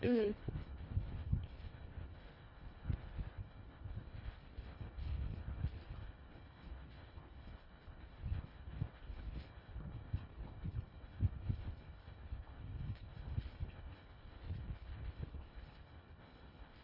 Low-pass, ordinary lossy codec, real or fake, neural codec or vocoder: 5.4 kHz; MP3, 32 kbps; fake; codec, 24 kHz, 1.5 kbps, HILCodec